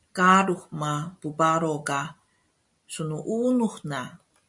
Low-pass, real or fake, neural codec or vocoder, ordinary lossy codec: 10.8 kHz; real; none; MP3, 96 kbps